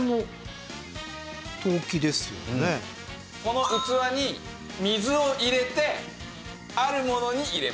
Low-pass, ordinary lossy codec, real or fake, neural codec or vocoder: none; none; real; none